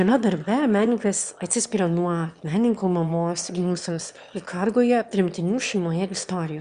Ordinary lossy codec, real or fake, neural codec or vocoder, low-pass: Opus, 64 kbps; fake; autoencoder, 22.05 kHz, a latent of 192 numbers a frame, VITS, trained on one speaker; 9.9 kHz